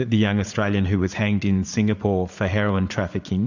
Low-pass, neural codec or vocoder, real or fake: 7.2 kHz; vocoder, 44.1 kHz, 80 mel bands, Vocos; fake